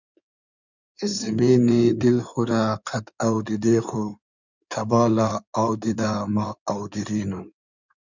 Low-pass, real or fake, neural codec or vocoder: 7.2 kHz; fake; codec, 16 kHz in and 24 kHz out, 2.2 kbps, FireRedTTS-2 codec